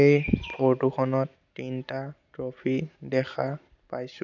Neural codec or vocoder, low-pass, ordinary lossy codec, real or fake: none; 7.2 kHz; none; real